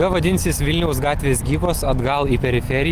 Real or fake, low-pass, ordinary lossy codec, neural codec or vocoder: real; 14.4 kHz; Opus, 24 kbps; none